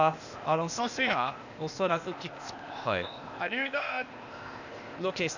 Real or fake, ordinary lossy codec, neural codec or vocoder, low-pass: fake; none; codec, 16 kHz, 0.8 kbps, ZipCodec; 7.2 kHz